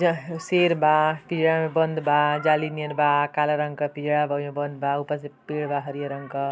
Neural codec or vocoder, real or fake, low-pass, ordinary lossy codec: none; real; none; none